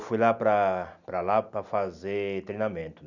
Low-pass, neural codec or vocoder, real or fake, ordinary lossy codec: 7.2 kHz; none; real; none